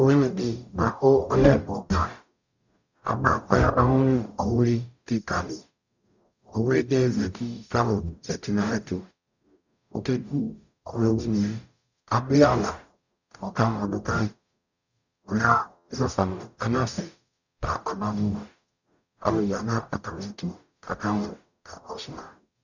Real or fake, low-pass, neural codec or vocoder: fake; 7.2 kHz; codec, 44.1 kHz, 0.9 kbps, DAC